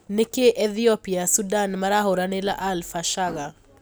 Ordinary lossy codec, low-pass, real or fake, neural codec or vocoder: none; none; real; none